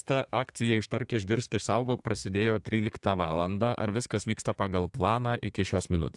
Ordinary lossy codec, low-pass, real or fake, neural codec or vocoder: AAC, 64 kbps; 10.8 kHz; fake; codec, 32 kHz, 1.9 kbps, SNAC